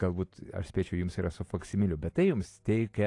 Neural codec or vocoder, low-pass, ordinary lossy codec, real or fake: none; 10.8 kHz; AAC, 48 kbps; real